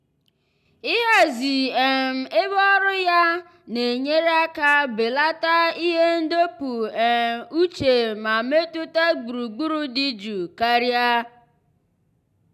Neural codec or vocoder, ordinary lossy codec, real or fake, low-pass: none; none; real; 14.4 kHz